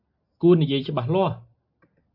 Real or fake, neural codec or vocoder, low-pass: real; none; 5.4 kHz